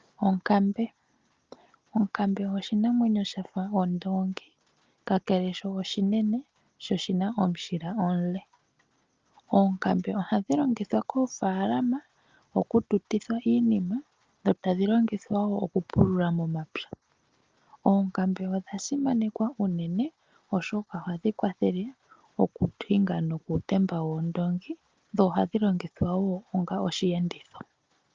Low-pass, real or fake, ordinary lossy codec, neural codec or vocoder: 7.2 kHz; real; Opus, 24 kbps; none